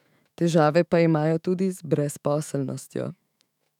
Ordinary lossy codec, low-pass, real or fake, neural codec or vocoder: none; 19.8 kHz; fake; autoencoder, 48 kHz, 128 numbers a frame, DAC-VAE, trained on Japanese speech